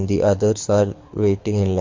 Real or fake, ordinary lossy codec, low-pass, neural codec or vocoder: fake; MP3, 48 kbps; 7.2 kHz; codec, 24 kHz, 6 kbps, HILCodec